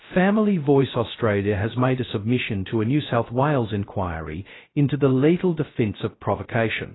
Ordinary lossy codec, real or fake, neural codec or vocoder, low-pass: AAC, 16 kbps; fake; codec, 16 kHz, 0.2 kbps, FocalCodec; 7.2 kHz